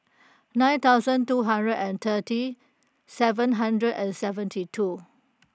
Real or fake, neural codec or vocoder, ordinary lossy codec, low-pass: real; none; none; none